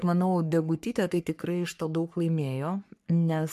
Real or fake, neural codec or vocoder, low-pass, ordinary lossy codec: fake; codec, 44.1 kHz, 3.4 kbps, Pupu-Codec; 14.4 kHz; MP3, 96 kbps